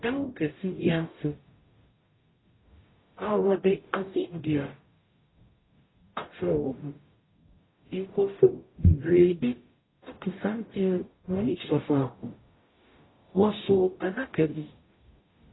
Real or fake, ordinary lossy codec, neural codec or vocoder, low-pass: fake; AAC, 16 kbps; codec, 44.1 kHz, 0.9 kbps, DAC; 7.2 kHz